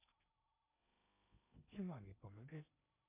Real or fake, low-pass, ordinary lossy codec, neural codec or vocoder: fake; 3.6 kHz; MP3, 32 kbps; codec, 16 kHz in and 24 kHz out, 0.8 kbps, FocalCodec, streaming, 65536 codes